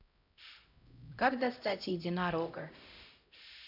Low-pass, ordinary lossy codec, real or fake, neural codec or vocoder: 5.4 kHz; none; fake; codec, 16 kHz, 0.5 kbps, X-Codec, HuBERT features, trained on LibriSpeech